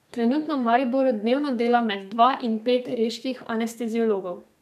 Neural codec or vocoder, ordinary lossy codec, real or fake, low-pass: codec, 32 kHz, 1.9 kbps, SNAC; none; fake; 14.4 kHz